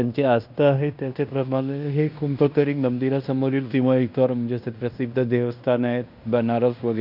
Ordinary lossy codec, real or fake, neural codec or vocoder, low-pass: none; fake; codec, 16 kHz in and 24 kHz out, 0.9 kbps, LongCat-Audio-Codec, fine tuned four codebook decoder; 5.4 kHz